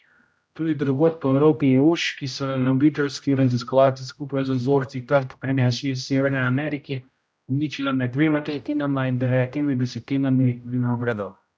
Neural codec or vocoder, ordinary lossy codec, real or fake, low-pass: codec, 16 kHz, 0.5 kbps, X-Codec, HuBERT features, trained on general audio; none; fake; none